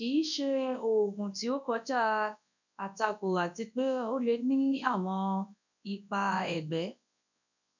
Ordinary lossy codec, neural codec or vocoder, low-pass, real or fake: none; codec, 24 kHz, 0.9 kbps, WavTokenizer, large speech release; 7.2 kHz; fake